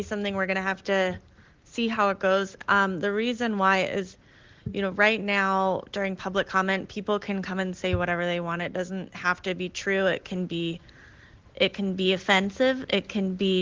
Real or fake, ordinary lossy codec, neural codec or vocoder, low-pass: real; Opus, 16 kbps; none; 7.2 kHz